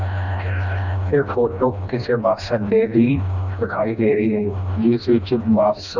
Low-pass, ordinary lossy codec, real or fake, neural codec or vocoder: 7.2 kHz; none; fake; codec, 16 kHz, 1 kbps, FreqCodec, smaller model